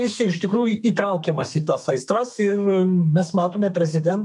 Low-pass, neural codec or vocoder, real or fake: 10.8 kHz; codec, 32 kHz, 1.9 kbps, SNAC; fake